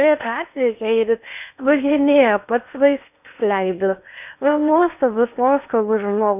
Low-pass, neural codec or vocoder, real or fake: 3.6 kHz; codec, 16 kHz in and 24 kHz out, 0.8 kbps, FocalCodec, streaming, 65536 codes; fake